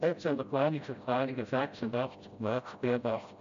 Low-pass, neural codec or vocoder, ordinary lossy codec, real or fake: 7.2 kHz; codec, 16 kHz, 0.5 kbps, FreqCodec, smaller model; MP3, 64 kbps; fake